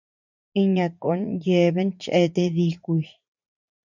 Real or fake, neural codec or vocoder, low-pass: fake; vocoder, 44.1 kHz, 80 mel bands, Vocos; 7.2 kHz